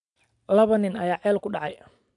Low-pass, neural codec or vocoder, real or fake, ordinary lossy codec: 10.8 kHz; none; real; MP3, 96 kbps